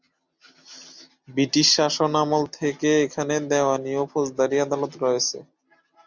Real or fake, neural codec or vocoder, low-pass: real; none; 7.2 kHz